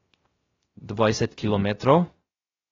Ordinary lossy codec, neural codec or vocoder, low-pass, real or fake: AAC, 24 kbps; codec, 16 kHz, 0.3 kbps, FocalCodec; 7.2 kHz; fake